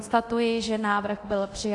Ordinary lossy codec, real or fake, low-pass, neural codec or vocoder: AAC, 48 kbps; fake; 10.8 kHz; codec, 24 kHz, 0.9 kbps, DualCodec